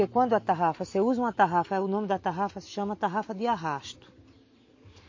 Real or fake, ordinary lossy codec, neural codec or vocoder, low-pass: real; MP3, 32 kbps; none; 7.2 kHz